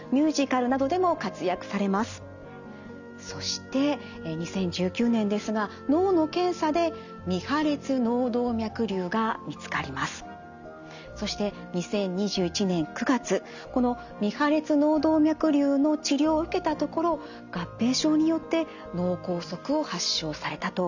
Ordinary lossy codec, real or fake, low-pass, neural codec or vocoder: none; real; 7.2 kHz; none